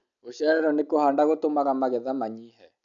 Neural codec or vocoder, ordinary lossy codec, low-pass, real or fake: none; none; 7.2 kHz; real